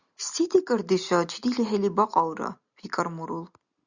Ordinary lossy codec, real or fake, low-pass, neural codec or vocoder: Opus, 64 kbps; real; 7.2 kHz; none